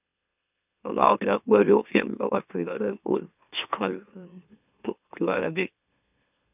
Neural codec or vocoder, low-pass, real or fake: autoencoder, 44.1 kHz, a latent of 192 numbers a frame, MeloTTS; 3.6 kHz; fake